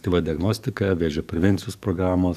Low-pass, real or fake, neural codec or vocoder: 14.4 kHz; fake; codec, 44.1 kHz, 7.8 kbps, DAC